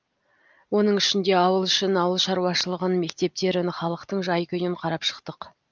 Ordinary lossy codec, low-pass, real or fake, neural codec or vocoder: Opus, 24 kbps; 7.2 kHz; real; none